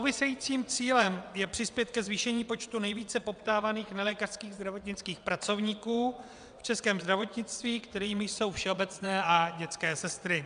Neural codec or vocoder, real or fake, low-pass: vocoder, 22.05 kHz, 80 mel bands, WaveNeXt; fake; 9.9 kHz